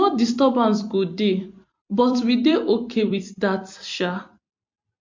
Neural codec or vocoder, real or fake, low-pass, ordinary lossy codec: vocoder, 44.1 kHz, 128 mel bands every 256 samples, BigVGAN v2; fake; 7.2 kHz; MP3, 48 kbps